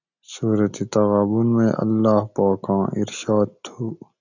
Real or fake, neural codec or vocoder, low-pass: real; none; 7.2 kHz